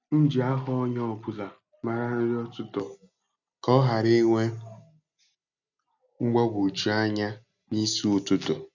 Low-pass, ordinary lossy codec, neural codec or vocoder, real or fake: 7.2 kHz; none; none; real